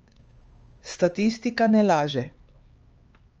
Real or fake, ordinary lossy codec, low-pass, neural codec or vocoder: fake; Opus, 24 kbps; 7.2 kHz; codec, 16 kHz, 4 kbps, X-Codec, HuBERT features, trained on LibriSpeech